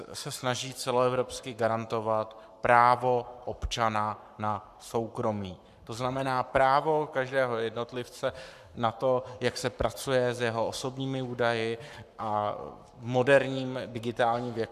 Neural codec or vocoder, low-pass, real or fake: codec, 44.1 kHz, 7.8 kbps, Pupu-Codec; 14.4 kHz; fake